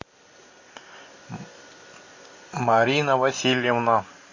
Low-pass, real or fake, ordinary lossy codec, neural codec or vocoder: 7.2 kHz; real; MP3, 32 kbps; none